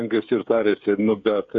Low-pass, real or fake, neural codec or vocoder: 7.2 kHz; fake; codec, 16 kHz, 8 kbps, FreqCodec, smaller model